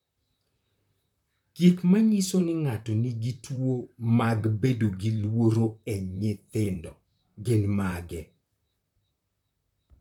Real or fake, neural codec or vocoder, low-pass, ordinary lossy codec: fake; vocoder, 44.1 kHz, 128 mel bands, Pupu-Vocoder; 19.8 kHz; none